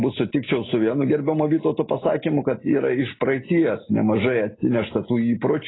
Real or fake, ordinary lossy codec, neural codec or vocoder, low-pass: real; AAC, 16 kbps; none; 7.2 kHz